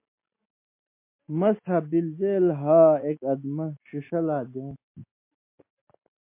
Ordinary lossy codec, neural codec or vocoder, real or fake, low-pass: MP3, 24 kbps; none; real; 3.6 kHz